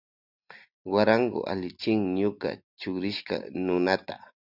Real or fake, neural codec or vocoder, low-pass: real; none; 5.4 kHz